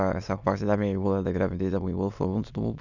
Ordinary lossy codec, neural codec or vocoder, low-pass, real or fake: none; autoencoder, 22.05 kHz, a latent of 192 numbers a frame, VITS, trained on many speakers; 7.2 kHz; fake